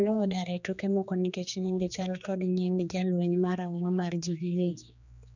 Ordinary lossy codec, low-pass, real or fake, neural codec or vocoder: none; 7.2 kHz; fake; codec, 16 kHz, 2 kbps, X-Codec, HuBERT features, trained on general audio